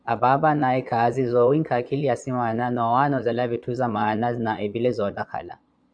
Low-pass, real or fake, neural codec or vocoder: 9.9 kHz; fake; vocoder, 22.05 kHz, 80 mel bands, Vocos